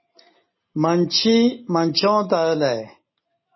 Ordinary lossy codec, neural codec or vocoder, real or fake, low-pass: MP3, 24 kbps; none; real; 7.2 kHz